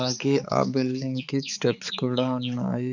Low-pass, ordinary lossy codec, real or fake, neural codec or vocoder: 7.2 kHz; none; fake; codec, 16 kHz, 4 kbps, X-Codec, HuBERT features, trained on balanced general audio